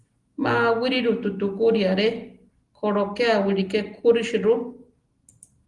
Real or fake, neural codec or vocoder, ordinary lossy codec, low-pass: real; none; Opus, 24 kbps; 10.8 kHz